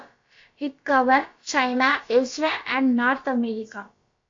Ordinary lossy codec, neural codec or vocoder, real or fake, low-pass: AAC, 48 kbps; codec, 16 kHz, about 1 kbps, DyCAST, with the encoder's durations; fake; 7.2 kHz